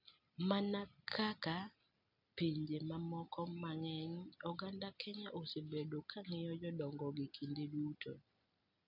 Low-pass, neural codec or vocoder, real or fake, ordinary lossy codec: 5.4 kHz; none; real; none